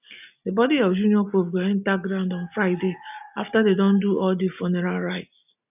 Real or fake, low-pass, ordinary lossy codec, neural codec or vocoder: real; 3.6 kHz; none; none